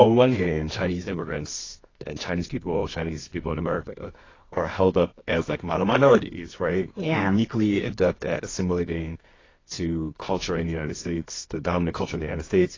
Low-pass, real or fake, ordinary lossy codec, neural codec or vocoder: 7.2 kHz; fake; AAC, 32 kbps; codec, 24 kHz, 0.9 kbps, WavTokenizer, medium music audio release